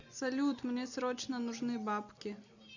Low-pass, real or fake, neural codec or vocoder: 7.2 kHz; real; none